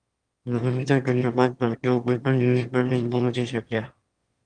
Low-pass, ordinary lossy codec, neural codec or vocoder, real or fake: 9.9 kHz; Opus, 32 kbps; autoencoder, 22.05 kHz, a latent of 192 numbers a frame, VITS, trained on one speaker; fake